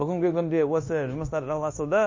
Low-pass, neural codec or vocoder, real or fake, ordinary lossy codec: 7.2 kHz; codec, 16 kHz, 0.9 kbps, LongCat-Audio-Codec; fake; MP3, 32 kbps